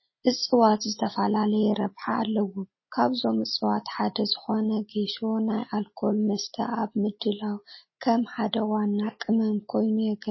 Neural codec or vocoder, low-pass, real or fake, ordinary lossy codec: none; 7.2 kHz; real; MP3, 24 kbps